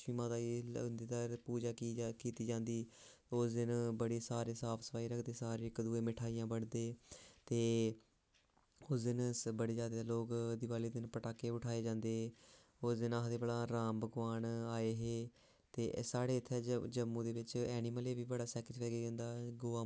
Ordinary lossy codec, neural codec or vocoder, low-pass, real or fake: none; none; none; real